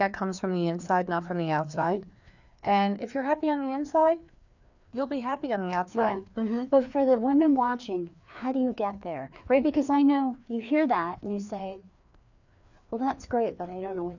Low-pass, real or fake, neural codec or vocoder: 7.2 kHz; fake; codec, 16 kHz, 2 kbps, FreqCodec, larger model